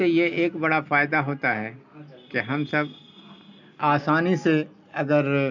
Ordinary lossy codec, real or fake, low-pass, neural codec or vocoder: none; real; 7.2 kHz; none